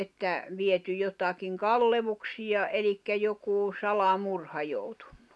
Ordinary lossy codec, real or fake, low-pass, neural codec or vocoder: none; real; none; none